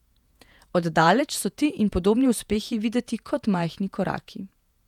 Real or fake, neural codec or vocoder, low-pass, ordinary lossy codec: fake; vocoder, 48 kHz, 128 mel bands, Vocos; 19.8 kHz; none